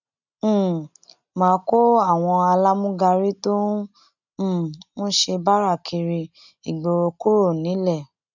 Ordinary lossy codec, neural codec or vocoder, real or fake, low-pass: none; none; real; 7.2 kHz